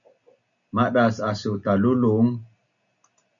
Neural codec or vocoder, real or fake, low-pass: none; real; 7.2 kHz